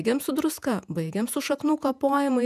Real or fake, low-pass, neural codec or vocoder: fake; 14.4 kHz; vocoder, 48 kHz, 128 mel bands, Vocos